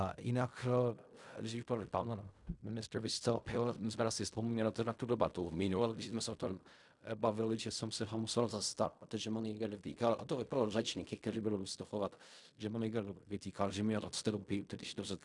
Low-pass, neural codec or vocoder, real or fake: 10.8 kHz; codec, 16 kHz in and 24 kHz out, 0.4 kbps, LongCat-Audio-Codec, fine tuned four codebook decoder; fake